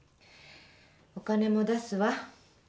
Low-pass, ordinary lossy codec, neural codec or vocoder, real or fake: none; none; none; real